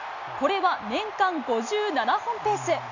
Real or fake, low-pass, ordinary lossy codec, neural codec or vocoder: real; 7.2 kHz; none; none